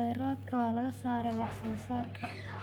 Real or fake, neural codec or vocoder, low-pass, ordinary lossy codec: fake; codec, 44.1 kHz, 3.4 kbps, Pupu-Codec; none; none